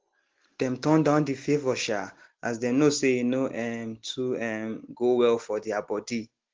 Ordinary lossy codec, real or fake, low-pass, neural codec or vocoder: Opus, 16 kbps; real; 7.2 kHz; none